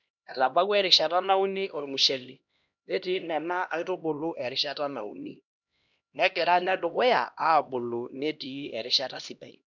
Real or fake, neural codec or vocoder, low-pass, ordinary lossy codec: fake; codec, 16 kHz, 1 kbps, X-Codec, HuBERT features, trained on LibriSpeech; 7.2 kHz; none